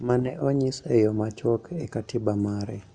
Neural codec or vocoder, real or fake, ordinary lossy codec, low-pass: none; real; none; 9.9 kHz